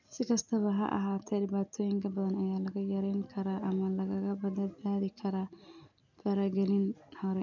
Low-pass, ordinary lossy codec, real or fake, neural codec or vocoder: 7.2 kHz; none; real; none